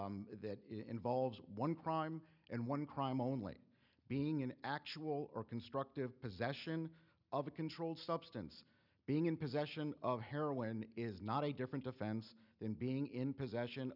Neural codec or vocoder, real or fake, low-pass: none; real; 5.4 kHz